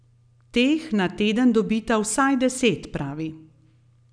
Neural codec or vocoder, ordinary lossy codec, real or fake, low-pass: none; none; real; 9.9 kHz